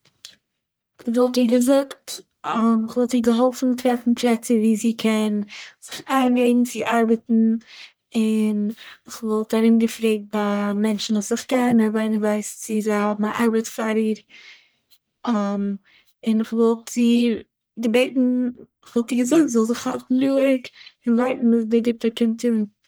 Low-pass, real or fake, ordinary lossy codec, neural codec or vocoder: none; fake; none; codec, 44.1 kHz, 1.7 kbps, Pupu-Codec